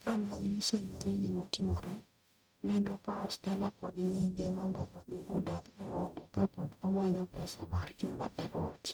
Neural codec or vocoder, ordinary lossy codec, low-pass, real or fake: codec, 44.1 kHz, 0.9 kbps, DAC; none; none; fake